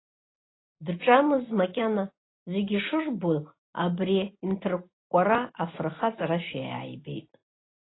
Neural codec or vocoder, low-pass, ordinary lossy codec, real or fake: none; 7.2 kHz; AAC, 16 kbps; real